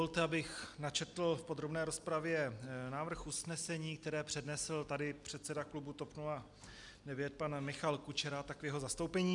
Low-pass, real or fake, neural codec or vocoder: 10.8 kHz; real; none